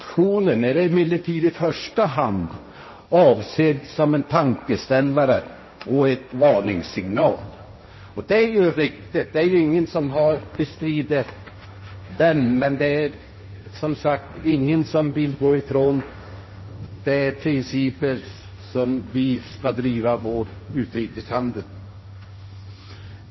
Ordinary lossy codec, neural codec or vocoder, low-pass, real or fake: MP3, 24 kbps; codec, 16 kHz, 1.1 kbps, Voila-Tokenizer; 7.2 kHz; fake